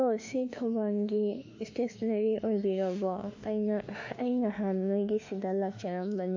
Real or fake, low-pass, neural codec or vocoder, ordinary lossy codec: fake; 7.2 kHz; autoencoder, 48 kHz, 32 numbers a frame, DAC-VAE, trained on Japanese speech; AAC, 48 kbps